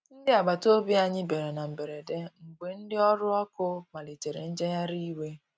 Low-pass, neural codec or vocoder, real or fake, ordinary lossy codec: none; none; real; none